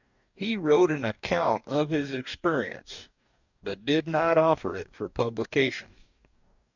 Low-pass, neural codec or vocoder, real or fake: 7.2 kHz; codec, 44.1 kHz, 2.6 kbps, DAC; fake